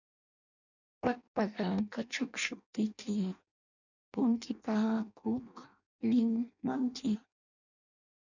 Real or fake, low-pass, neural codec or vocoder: fake; 7.2 kHz; codec, 16 kHz in and 24 kHz out, 0.6 kbps, FireRedTTS-2 codec